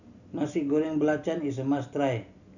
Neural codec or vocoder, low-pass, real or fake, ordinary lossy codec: none; 7.2 kHz; real; none